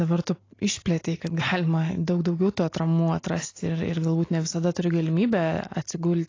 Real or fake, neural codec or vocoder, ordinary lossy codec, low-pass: real; none; AAC, 32 kbps; 7.2 kHz